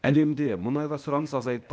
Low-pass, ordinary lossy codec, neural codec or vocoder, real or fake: none; none; codec, 16 kHz, 0.8 kbps, ZipCodec; fake